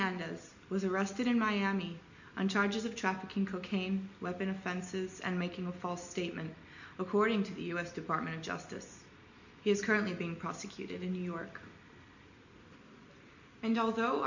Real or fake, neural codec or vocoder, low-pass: real; none; 7.2 kHz